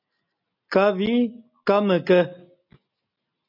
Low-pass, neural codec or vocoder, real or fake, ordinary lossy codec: 5.4 kHz; none; real; MP3, 48 kbps